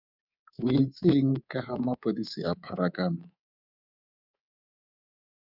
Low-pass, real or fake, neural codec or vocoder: 5.4 kHz; fake; codec, 24 kHz, 3.1 kbps, DualCodec